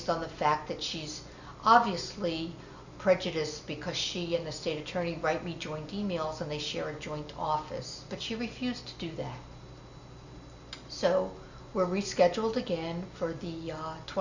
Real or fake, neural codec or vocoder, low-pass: real; none; 7.2 kHz